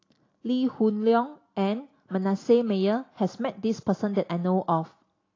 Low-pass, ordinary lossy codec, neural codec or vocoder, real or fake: 7.2 kHz; AAC, 32 kbps; none; real